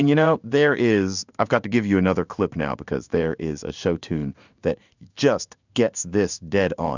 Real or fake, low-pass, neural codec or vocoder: fake; 7.2 kHz; codec, 16 kHz in and 24 kHz out, 1 kbps, XY-Tokenizer